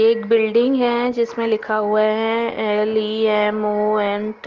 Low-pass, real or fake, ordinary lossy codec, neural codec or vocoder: 7.2 kHz; real; Opus, 16 kbps; none